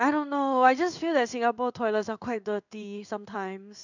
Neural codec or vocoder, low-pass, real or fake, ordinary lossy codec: codec, 16 kHz in and 24 kHz out, 1 kbps, XY-Tokenizer; 7.2 kHz; fake; none